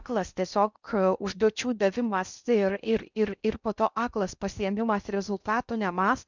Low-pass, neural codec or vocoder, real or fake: 7.2 kHz; codec, 16 kHz in and 24 kHz out, 0.6 kbps, FocalCodec, streaming, 4096 codes; fake